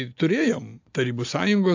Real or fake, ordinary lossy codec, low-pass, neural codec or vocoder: real; AAC, 48 kbps; 7.2 kHz; none